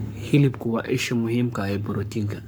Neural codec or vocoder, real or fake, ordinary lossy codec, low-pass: codec, 44.1 kHz, 7.8 kbps, Pupu-Codec; fake; none; none